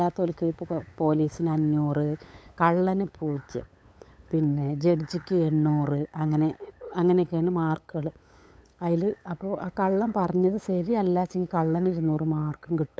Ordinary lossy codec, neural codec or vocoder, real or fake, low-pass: none; codec, 16 kHz, 8 kbps, FunCodec, trained on LibriTTS, 25 frames a second; fake; none